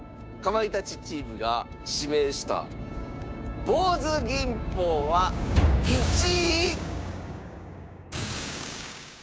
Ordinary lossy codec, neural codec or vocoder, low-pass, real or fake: none; codec, 16 kHz, 6 kbps, DAC; none; fake